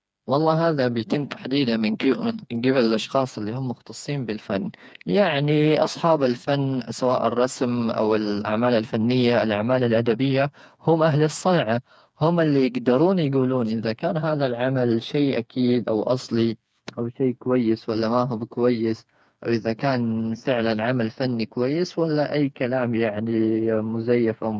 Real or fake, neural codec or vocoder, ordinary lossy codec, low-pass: fake; codec, 16 kHz, 4 kbps, FreqCodec, smaller model; none; none